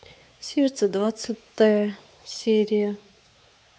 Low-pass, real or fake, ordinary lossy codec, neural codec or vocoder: none; fake; none; codec, 16 kHz, 4 kbps, X-Codec, WavLM features, trained on Multilingual LibriSpeech